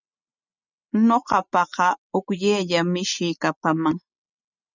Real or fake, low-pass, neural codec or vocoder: real; 7.2 kHz; none